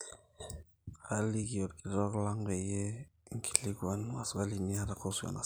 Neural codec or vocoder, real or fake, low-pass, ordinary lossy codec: none; real; none; none